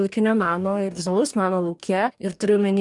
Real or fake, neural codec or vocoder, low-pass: fake; codec, 44.1 kHz, 2.6 kbps, DAC; 10.8 kHz